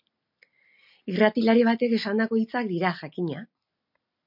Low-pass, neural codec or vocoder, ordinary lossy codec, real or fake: 5.4 kHz; none; MP3, 48 kbps; real